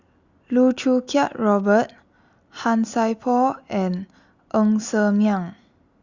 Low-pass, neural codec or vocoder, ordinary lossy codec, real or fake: 7.2 kHz; none; Opus, 64 kbps; real